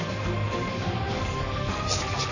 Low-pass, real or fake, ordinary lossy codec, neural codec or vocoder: 7.2 kHz; fake; none; codec, 44.1 kHz, 7.8 kbps, Pupu-Codec